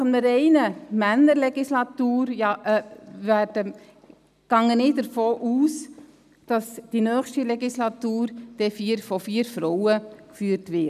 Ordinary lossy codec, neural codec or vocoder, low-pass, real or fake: none; none; 14.4 kHz; real